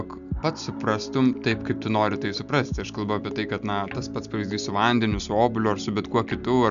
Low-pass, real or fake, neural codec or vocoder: 7.2 kHz; real; none